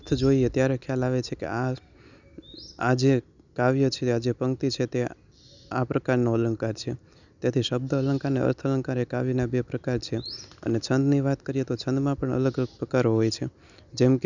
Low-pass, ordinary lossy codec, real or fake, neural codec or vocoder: 7.2 kHz; none; real; none